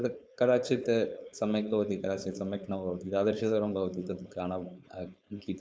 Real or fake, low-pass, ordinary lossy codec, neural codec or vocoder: fake; none; none; codec, 16 kHz, 4.8 kbps, FACodec